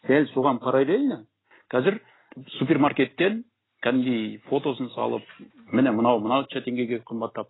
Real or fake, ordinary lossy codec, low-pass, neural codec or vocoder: real; AAC, 16 kbps; 7.2 kHz; none